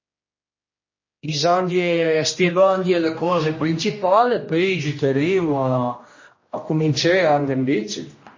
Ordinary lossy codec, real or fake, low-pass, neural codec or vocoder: MP3, 32 kbps; fake; 7.2 kHz; codec, 16 kHz, 1 kbps, X-Codec, HuBERT features, trained on general audio